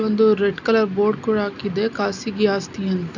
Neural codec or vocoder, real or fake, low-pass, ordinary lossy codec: none; real; 7.2 kHz; none